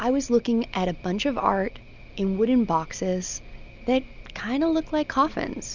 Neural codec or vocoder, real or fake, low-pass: none; real; 7.2 kHz